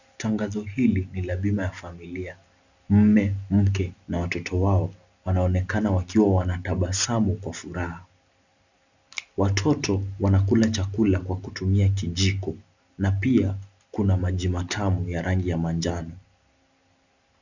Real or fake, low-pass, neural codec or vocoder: real; 7.2 kHz; none